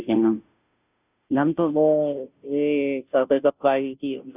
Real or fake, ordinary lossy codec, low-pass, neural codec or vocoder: fake; none; 3.6 kHz; codec, 16 kHz, 0.5 kbps, FunCodec, trained on Chinese and English, 25 frames a second